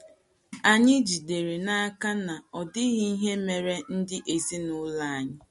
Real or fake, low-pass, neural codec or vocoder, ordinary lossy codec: real; 19.8 kHz; none; MP3, 48 kbps